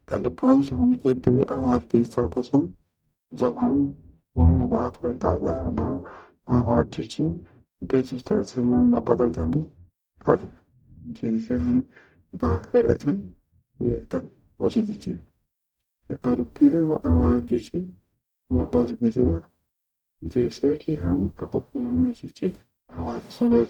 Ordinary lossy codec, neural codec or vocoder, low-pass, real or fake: none; codec, 44.1 kHz, 0.9 kbps, DAC; 19.8 kHz; fake